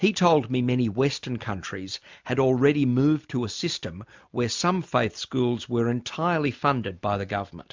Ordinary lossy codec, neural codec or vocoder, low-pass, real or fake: MP3, 64 kbps; none; 7.2 kHz; real